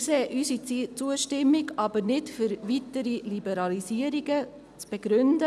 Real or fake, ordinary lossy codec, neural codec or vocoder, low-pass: real; none; none; none